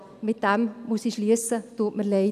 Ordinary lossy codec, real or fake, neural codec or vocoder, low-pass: none; real; none; 14.4 kHz